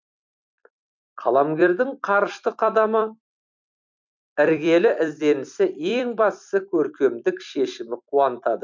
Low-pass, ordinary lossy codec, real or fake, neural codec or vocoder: 7.2 kHz; MP3, 64 kbps; fake; vocoder, 44.1 kHz, 128 mel bands every 256 samples, BigVGAN v2